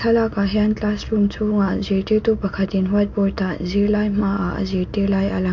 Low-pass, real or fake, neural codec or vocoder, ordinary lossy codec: 7.2 kHz; real; none; MP3, 48 kbps